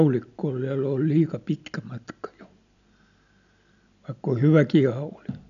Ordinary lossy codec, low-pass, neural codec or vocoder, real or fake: none; 7.2 kHz; none; real